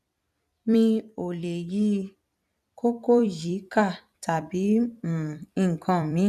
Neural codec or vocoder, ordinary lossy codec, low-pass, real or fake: vocoder, 44.1 kHz, 128 mel bands every 256 samples, BigVGAN v2; none; 14.4 kHz; fake